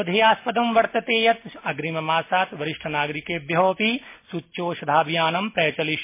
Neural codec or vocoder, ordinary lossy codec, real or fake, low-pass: none; MP3, 24 kbps; real; 3.6 kHz